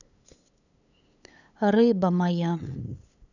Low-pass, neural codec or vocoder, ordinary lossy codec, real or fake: 7.2 kHz; codec, 16 kHz, 8 kbps, FunCodec, trained on LibriTTS, 25 frames a second; none; fake